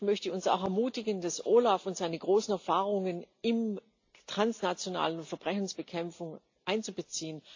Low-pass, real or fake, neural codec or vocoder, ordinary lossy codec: 7.2 kHz; real; none; AAC, 48 kbps